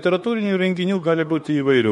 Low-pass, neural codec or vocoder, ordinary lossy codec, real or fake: 19.8 kHz; autoencoder, 48 kHz, 32 numbers a frame, DAC-VAE, trained on Japanese speech; MP3, 48 kbps; fake